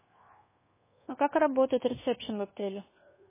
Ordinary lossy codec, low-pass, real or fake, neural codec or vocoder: MP3, 16 kbps; 3.6 kHz; fake; codec, 24 kHz, 1.2 kbps, DualCodec